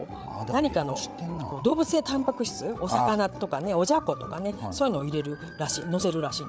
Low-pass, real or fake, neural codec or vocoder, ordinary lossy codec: none; fake; codec, 16 kHz, 8 kbps, FreqCodec, larger model; none